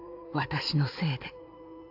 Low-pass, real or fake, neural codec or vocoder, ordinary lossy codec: 5.4 kHz; fake; codec, 16 kHz in and 24 kHz out, 2.2 kbps, FireRedTTS-2 codec; AAC, 48 kbps